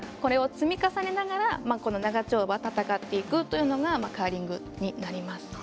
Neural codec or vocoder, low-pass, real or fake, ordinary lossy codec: none; none; real; none